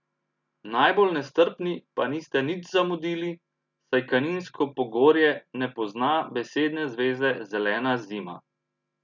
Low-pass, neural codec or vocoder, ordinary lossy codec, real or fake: 7.2 kHz; none; none; real